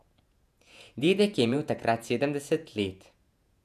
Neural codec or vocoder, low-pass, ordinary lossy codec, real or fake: vocoder, 48 kHz, 128 mel bands, Vocos; 14.4 kHz; none; fake